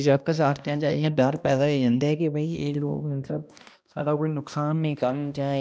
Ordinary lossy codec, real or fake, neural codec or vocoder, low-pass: none; fake; codec, 16 kHz, 1 kbps, X-Codec, HuBERT features, trained on balanced general audio; none